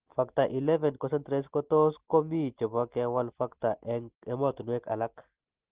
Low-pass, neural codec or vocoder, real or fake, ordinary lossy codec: 3.6 kHz; none; real; Opus, 16 kbps